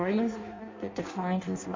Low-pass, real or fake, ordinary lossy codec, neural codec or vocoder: 7.2 kHz; fake; MP3, 32 kbps; codec, 16 kHz in and 24 kHz out, 0.6 kbps, FireRedTTS-2 codec